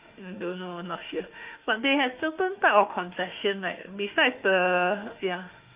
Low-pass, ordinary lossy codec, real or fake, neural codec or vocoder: 3.6 kHz; Opus, 24 kbps; fake; autoencoder, 48 kHz, 32 numbers a frame, DAC-VAE, trained on Japanese speech